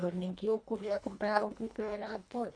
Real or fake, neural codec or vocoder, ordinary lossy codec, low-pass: fake; codec, 24 kHz, 1.5 kbps, HILCodec; MP3, 64 kbps; 9.9 kHz